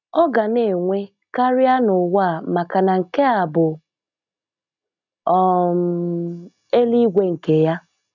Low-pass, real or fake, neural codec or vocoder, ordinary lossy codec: 7.2 kHz; real; none; none